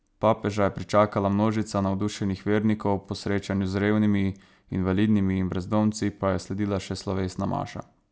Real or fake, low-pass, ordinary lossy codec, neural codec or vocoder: real; none; none; none